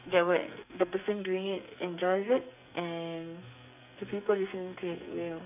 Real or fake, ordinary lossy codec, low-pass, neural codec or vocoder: fake; none; 3.6 kHz; codec, 44.1 kHz, 2.6 kbps, SNAC